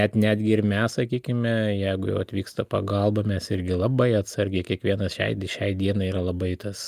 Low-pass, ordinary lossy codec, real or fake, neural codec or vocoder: 14.4 kHz; Opus, 32 kbps; real; none